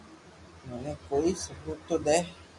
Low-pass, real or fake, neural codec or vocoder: 10.8 kHz; real; none